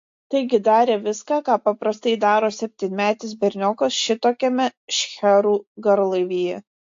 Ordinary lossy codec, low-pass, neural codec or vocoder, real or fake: AAC, 48 kbps; 7.2 kHz; none; real